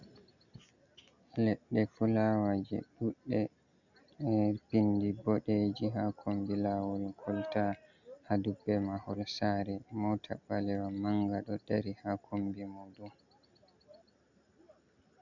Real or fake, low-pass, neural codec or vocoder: real; 7.2 kHz; none